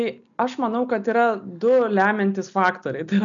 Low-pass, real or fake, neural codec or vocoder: 7.2 kHz; real; none